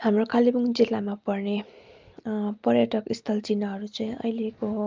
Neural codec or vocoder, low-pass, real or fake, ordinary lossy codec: none; 7.2 kHz; real; Opus, 32 kbps